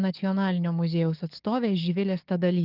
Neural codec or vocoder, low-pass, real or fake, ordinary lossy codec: codec, 44.1 kHz, 7.8 kbps, DAC; 5.4 kHz; fake; Opus, 32 kbps